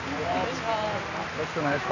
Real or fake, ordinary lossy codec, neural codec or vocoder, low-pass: real; none; none; 7.2 kHz